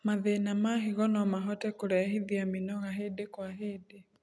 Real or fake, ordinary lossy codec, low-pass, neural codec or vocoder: real; none; none; none